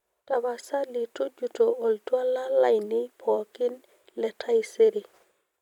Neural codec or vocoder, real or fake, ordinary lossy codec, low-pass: vocoder, 44.1 kHz, 128 mel bands every 256 samples, BigVGAN v2; fake; none; 19.8 kHz